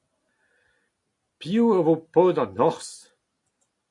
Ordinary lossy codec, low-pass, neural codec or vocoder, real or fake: AAC, 48 kbps; 10.8 kHz; none; real